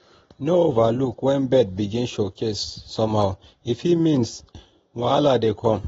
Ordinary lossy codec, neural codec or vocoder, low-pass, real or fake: AAC, 24 kbps; none; 19.8 kHz; real